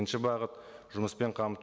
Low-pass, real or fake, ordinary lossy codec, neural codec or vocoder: none; real; none; none